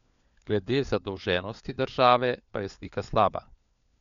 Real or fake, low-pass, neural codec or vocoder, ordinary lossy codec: fake; 7.2 kHz; codec, 16 kHz, 4 kbps, FunCodec, trained on LibriTTS, 50 frames a second; none